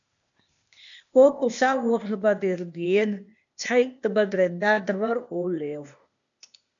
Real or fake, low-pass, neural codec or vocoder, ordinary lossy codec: fake; 7.2 kHz; codec, 16 kHz, 0.8 kbps, ZipCodec; MP3, 96 kbps